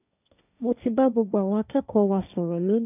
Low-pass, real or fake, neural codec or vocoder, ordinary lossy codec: 3.6 kHz; fake; codec, 16 kHz, 1.1 kbps, Voila-Tokenizer; none